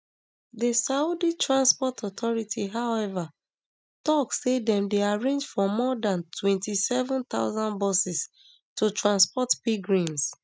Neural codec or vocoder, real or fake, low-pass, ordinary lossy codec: none; real; none; none